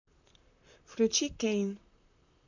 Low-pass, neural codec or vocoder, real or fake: 7.2 kHz; vocoder, 44.1 kHz, 128 mel bands, Pupu-Vocoder; fake